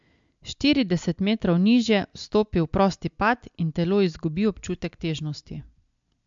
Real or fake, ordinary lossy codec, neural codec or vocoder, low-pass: real; MP3, 64 kbps; none; 7.2 kHz